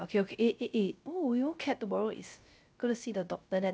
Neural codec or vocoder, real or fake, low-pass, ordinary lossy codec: codec, 16 kHz, 0.3 kbps, FocalCodec; fake; none; none